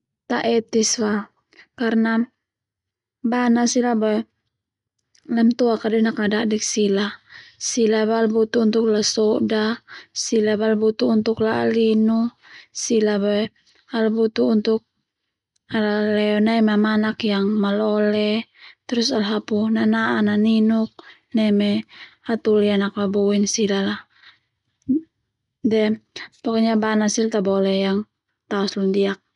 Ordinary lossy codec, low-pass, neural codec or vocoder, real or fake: none; 10.8 kHz; none; real